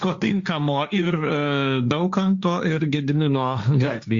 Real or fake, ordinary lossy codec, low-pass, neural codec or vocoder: fake; Opus, 64 kbps; 7.2 kHz; codec, 16 kHz, 1.1 kbps, Voila-Tokenizer